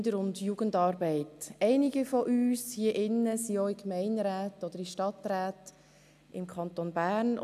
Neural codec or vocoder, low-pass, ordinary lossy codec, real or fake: none; 14.4 kHz; none; real